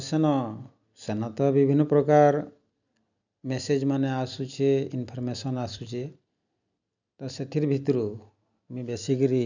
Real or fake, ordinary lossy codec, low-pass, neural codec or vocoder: real; none; 7.2 kHz; none